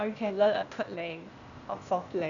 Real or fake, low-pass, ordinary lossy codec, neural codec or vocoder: fake; 7.2 kHz; none; codec, 16 kHz, 0.8 kbps, ZipCodec